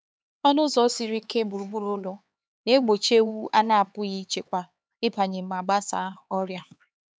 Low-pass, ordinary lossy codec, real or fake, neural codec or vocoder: none; none; fake; codec, 16 kHz, 4 kbps, X-Codec, HuBERT features, trained on LibriSpeech